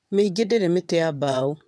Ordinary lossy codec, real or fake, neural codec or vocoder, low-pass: none; fake; vocoder, 22.05 kHz, 80 mel bands, WaveNeXt; none